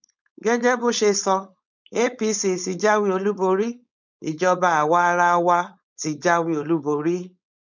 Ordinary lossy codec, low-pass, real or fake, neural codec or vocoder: none; 7.2 kHz; fake; codec, 16 kHz, 4.8 kbps, FACodec